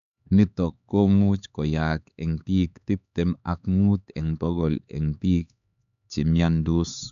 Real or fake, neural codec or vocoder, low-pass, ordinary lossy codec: fake; codec, 16 kHz, 4 kbps, X-Codec, HuBERT features, trained on LibriSpeech; 7.2 kHz; none